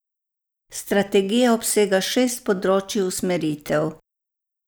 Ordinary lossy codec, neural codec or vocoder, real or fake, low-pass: none; none; real; none